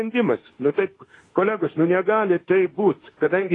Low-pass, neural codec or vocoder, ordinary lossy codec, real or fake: 10.8 kHz; autoencoder, 48 kHz, 32 numbers a frame, DAC-VAE, trained on Japanese speech; AAC, 32 kbps; fake